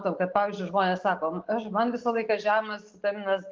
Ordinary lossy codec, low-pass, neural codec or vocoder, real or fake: Opus, 32 kbps; 7.2 kHz; none; real